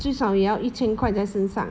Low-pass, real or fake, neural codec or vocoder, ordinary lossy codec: none; real; none; none